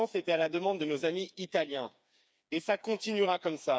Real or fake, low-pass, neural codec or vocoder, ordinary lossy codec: fake; none; codec, 16 kHz, 4 kbps, FreqCodec, smaller model; none